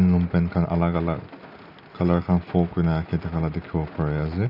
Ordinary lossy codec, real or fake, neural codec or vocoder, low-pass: none; real; none; 5.4 kHz